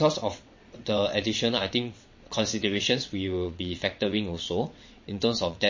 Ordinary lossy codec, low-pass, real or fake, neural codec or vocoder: MP3, 32 kbps; 7.2 kHz; fake; vocoder, 22.05 kHz, 80 mel bands, WaveNeXt